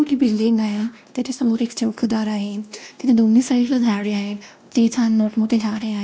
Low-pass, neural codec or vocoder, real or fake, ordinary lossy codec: none; codec, 16 kHz, 1 kbps, X-Codec, WavLM features, trained on Multilingual LibriSpeech; fake; none